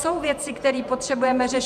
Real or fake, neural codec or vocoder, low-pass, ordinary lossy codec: fake; vocoder, 48 kHz, 128 mel bands, Vocos; 14.4 kHz; MP3, 96 kbps